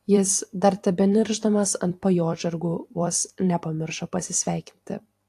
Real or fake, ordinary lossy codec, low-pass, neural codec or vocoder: fake; AAC, 64 kbps; 14.4 kHz; vocoder, 44.1 kHz, 128 mel bands every 256 samples, BigVGAN v2